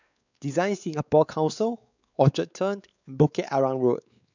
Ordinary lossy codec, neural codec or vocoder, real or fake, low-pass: none; codec, 16 kHz, 4 kbps, X-Codec, WavLM features, trained on Multilingual LibriSpeech; fake; 7.2 kHz